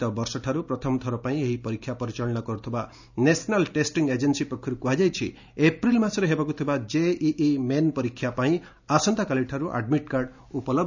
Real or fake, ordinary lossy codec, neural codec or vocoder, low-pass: real; none; none; 7.2 kHz